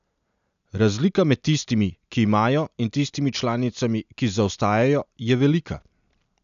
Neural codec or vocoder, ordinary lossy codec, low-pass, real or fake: none; none; 7.2 kHz; real